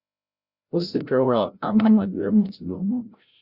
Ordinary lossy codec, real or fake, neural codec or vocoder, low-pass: Opus, 64 kbps; fake; codec, 16 kHz, 0.5 kbps, FreqCodec, larger model; 5.4 kHz